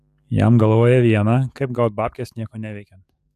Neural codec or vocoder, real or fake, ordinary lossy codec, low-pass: codec, 44.1 kHz, 7.8 kbps, DAC; fake; AAC, 96 kbps; 14.4 kHz